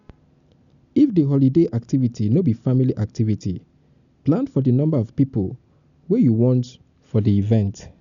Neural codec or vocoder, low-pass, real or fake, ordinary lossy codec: none; 7.2 kHz; real; none